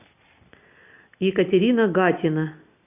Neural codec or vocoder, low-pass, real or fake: none; 3.6 kHz; real